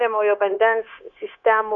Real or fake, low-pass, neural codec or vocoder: fake; 7.2 kHz; codec, 16 kHz, 0.9 kbps, LongCat-Audio-Codec